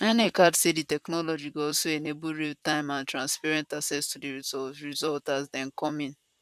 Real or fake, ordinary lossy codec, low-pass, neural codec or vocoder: fake; none; 14.4 kHz; vocoder, 44.1 kHz, 128 mel bands, Pupu-Vocoder